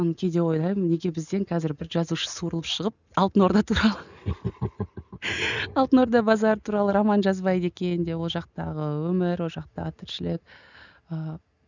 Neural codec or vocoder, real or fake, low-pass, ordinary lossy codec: none; real; 7.2 kHz; none